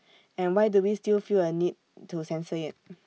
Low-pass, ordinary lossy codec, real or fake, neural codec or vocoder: none; none; real; none